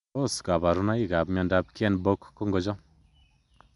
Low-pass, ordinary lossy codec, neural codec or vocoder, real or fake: 10.8 kHz; none; none; real